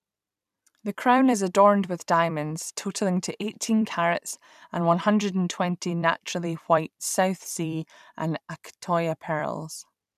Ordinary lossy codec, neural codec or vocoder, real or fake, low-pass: none; vocoder, 44.1 kHz, 128 mel bands every 256 samples, BigVGAN v2; fake; 14.4 kHz